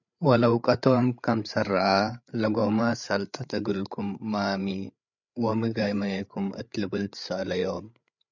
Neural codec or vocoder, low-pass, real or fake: codec, 16 kHz, 8 kbps, FreqCodec, larger model; 7.2 kHz; fake